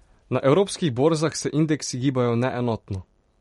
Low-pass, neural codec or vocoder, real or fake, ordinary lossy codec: 19.8 kHz; vocoder, 44.1 kHz, 128 mel bands every 256 samples, BigVGAN v2; fake; MP3, 48 kbps